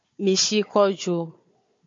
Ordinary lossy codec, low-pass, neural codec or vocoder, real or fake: MP3, 48 kbps; 7.2 kHz; codec, 16 kHz, 4 kbps, FunCodec, trained on Chinese and English, 50 frames a second; fake